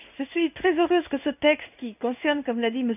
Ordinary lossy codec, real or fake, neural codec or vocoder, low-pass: none; fake; codec, 16 kHz in and 24 kHz out, 1 kbps, XY-Tokenizer; 3.6 kHz